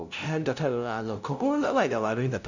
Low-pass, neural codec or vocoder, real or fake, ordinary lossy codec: 7.2 kHz; codec, 16 kHz, 0.5 kbps, FunCodec, trained on LibriTTS, 25 frames a second; fake; none